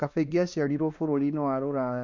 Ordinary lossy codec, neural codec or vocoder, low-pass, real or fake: none; codec, 16 kHz, 2 kbps, X-Codec, WavLM features, trained on Multilingual LibriSpeech; 7.2 kHz; fake